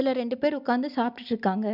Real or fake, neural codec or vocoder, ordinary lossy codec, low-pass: real; none; none; 5.4 kHz